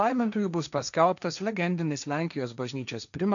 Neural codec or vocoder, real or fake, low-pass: codec, 16 kHz, 1.1 kbps, Voila-Tokenizer; fake; 7.2 kHz